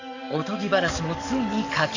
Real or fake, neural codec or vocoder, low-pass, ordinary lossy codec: fake; codec, 44.1 kHz, 7.8 kbps, DAC; 7.2 kHz; none